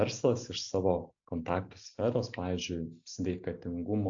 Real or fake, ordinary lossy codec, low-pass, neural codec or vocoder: real; AAC, 64 kbps; 7.2 kHz; none